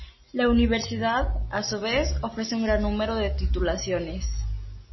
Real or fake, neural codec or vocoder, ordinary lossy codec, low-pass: real; none; MP3, 24 kbps; 7.2 kHz